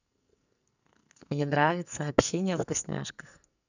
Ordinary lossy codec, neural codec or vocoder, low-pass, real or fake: none; codec, 44.1 kHz, 2.6 kbps, SNAC; 7.2 kHz; fake